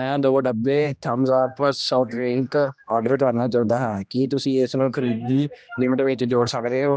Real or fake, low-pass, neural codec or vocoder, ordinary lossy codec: fake; none; codec, 16 kHz, 1 kbps, X-Codec, HuBERT features, trained on general audio; none